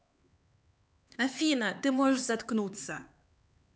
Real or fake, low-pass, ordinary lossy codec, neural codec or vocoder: fake; none; none; codec, 16 kHz, 4 kbps, X-Codec, HuBERT features, trained on LibriSpeech